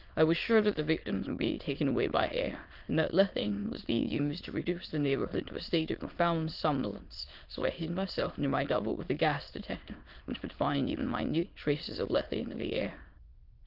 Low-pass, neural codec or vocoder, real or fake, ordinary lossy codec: 5.4 kHz; autoencoder, 22.05 kHz, a latent of 192 numbers a frame, VITS, trained on many speakers; fake; Opus, 32 kbps